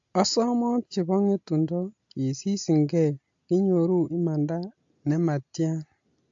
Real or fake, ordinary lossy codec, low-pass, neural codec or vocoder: real; AAC, 48 kbps; 7.2 kHz; none